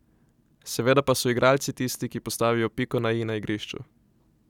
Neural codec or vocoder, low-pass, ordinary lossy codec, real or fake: none; 19.8 kHz; none; real